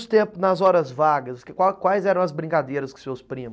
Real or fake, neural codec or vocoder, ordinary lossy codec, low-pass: real; none; none; none